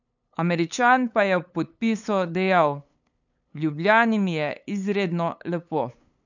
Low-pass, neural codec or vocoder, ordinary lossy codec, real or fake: 7.2 kHz; codec, 16 kHz, 8 kbps, FunCodec, trained on LibriTTS, 25 frames a second; none; fake